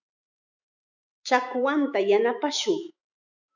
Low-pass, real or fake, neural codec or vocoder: 7.2 kHz; fake; autoencoder, 48 kHz, 128 numbers a frame, DAC-VAE, trained on Japanese speech